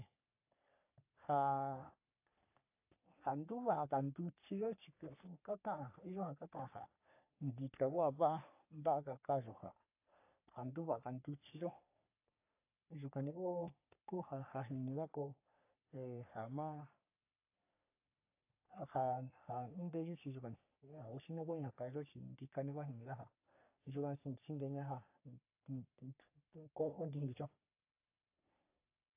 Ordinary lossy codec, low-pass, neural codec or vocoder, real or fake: AAC, 32 kbps; 3.6 kHz; codec, 44.1 kHz, 3.4 kbps, Pupu-Codec; fake